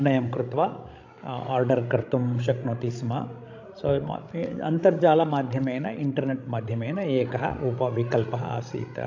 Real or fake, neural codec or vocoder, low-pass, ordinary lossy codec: fake; codec, 16 kHz, 16 kbps, FreqCodec, larger model; 7.2 kHz; AAC, 48 kbps